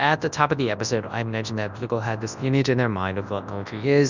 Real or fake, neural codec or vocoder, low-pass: fake; codec, 24 kHz, 0.9 kbps, WavTokenizer, large speech release; 7.2 kHz